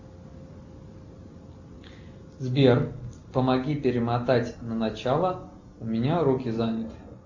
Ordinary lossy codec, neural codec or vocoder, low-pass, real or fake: Opus, 64 kbps; none; 7.2 kHz; real